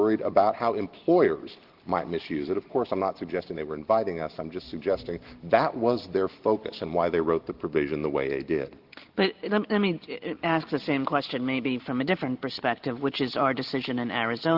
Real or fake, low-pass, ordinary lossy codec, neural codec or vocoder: real; 5.4 kHz; Opus, 16 kbps; none